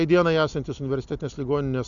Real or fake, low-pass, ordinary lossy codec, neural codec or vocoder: real; 7.2 kHz; MP3, 96 kbps; none